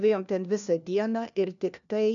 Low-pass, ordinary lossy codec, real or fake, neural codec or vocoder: 7.2 kHz; MP3, 96 kbps; fake; codec, 16 kHz, 1 kbps, FunCodec, trained on LibriTTS, 50 frames a second